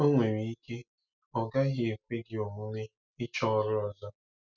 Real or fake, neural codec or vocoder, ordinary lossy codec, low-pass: real; none; none; 7.2 kHz